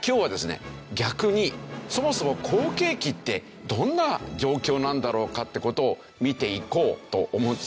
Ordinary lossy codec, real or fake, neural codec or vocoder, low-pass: none; real; none; none